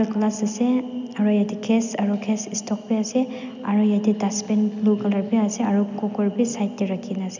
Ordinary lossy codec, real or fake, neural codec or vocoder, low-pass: none; real; none; 7.2 kHz